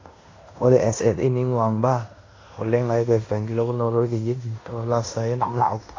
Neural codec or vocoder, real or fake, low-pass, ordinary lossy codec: codec, 16 kHz in and 24 kHz out, 0.9 kbps, LongCat-Audio-Codec, fine tuned four codebook decoder; fake; 7.2 kHz; AAC, 32 kbps